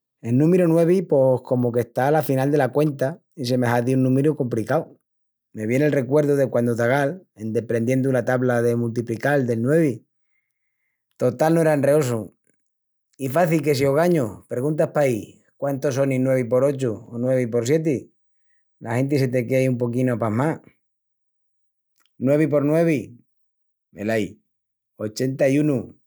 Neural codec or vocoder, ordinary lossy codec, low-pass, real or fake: none; none; none; real